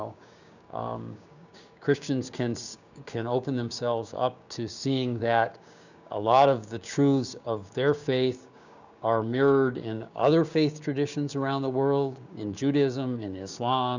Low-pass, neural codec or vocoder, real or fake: 7.2 kHz; codec, 16 kHz, 6 kbps, DAC; fake